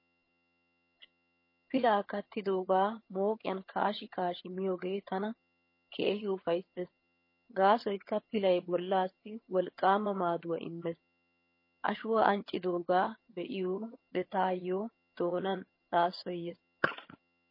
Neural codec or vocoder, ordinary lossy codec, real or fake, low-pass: vocoder, 22.05 kHz, 80 mel bands, HiFi-GAN; MP3, 24 kbps; fake; 5.4 kHz